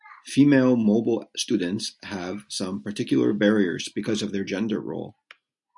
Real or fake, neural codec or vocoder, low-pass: real; none; 10.8 kHz